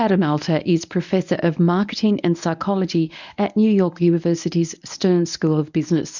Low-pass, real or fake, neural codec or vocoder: 7.2 kHz; fake; codec, 24 kHz, 0.9 kbps, WavTokenizer, medium speech release version 1